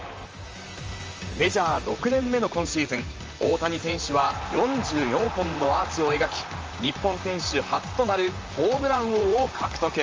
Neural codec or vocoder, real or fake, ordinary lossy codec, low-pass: vocoder, 44.1 kHz, 128 mel bands, Pupu-Vocoder; fake; Opus, 24 kbps; 7.2 kHz